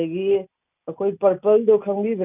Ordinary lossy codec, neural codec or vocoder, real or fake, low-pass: none; none; real; 3.6 kHz